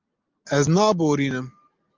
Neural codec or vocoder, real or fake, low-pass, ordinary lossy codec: none; real; 7.2 kHz; Opus, 24 kbps